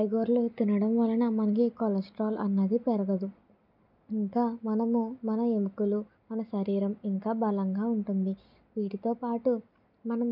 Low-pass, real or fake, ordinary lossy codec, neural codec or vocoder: 5.4 kHz; real; none; none